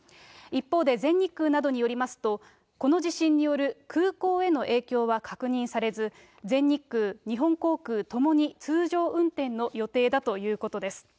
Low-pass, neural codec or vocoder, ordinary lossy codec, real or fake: none; none; none; real